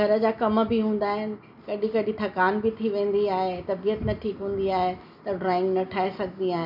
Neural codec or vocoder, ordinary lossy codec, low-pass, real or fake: none; none; 5.4 kHz; real